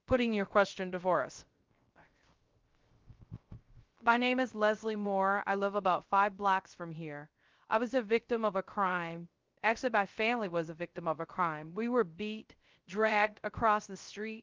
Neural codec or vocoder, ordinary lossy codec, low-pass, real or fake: codec, 16 kHz, 0.3 kbps, FocalCodec; Opus, 32 kbps; 7.2 kHz; fake